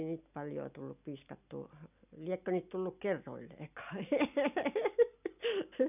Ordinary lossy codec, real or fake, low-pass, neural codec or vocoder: none; real; 3.6 kHz; none